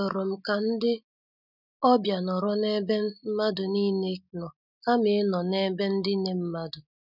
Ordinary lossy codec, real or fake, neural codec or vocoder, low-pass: none; real; none; 5.4 kHz